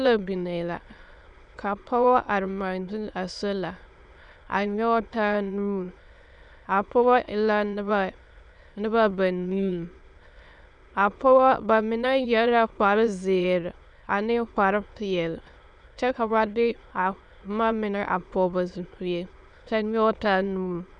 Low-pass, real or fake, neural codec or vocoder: 9.9 kHz; fake; autoencoder, 22.05 kHz, a latent of 192 numbers a frame, VITS, trained on many speakers